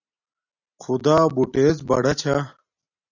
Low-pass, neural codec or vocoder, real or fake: 7.2 kHz; none; real